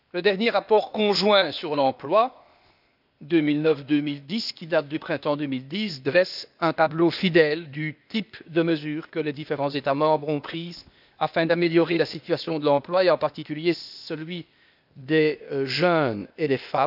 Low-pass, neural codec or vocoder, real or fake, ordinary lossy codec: 5.4 kHz; codec, 16 kHz, 0.8 kbps, ZipCodec; fake; none